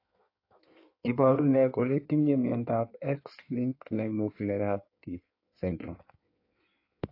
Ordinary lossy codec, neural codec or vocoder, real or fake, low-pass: AAC, 32 kbps; codec, 16 kHz in and 24 kHz out, 1.1 kbps, FireRedTTS-2 codec; fake; 5.4 kHz